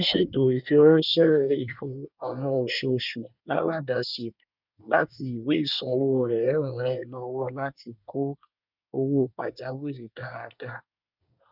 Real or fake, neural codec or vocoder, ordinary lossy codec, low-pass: fake; codec, 24 kHz, 1 kbps, SNAC; none; 5.4 kHz